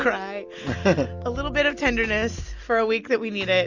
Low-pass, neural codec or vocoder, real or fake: 7.2 kHz; none; real